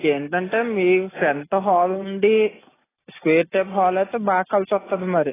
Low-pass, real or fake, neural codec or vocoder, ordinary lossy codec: 3.6 kHz; real; none; AAC, 16 kbps